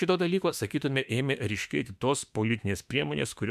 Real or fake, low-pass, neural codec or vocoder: fake; 14.4 kHz; autoencoder, 48 kHz, 32 numbers a frame, DAC-VAE, trained on Japanese speech